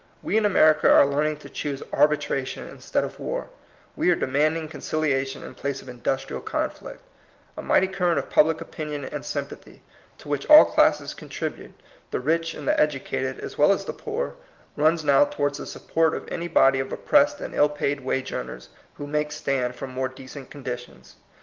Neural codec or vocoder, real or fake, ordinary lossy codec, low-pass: none; real; Opus, 32 kbps; 7.2 kHz